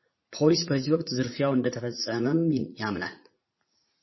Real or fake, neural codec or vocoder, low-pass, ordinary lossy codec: fake; vocoder, 44.1 kHz, 80 mel bands, Vocos; 7.2 kHz; MP3, 24 kbps